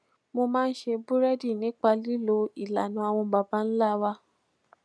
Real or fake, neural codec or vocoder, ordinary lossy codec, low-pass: real; none; none; none